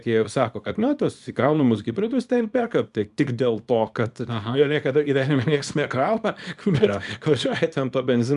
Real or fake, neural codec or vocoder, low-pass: fake; codec, 24 kHz, 0.9 kbps, WavTokenizer, small release; 10.8 kHz